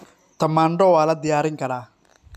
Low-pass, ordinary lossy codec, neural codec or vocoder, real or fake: 14.4 kHz; none; none; real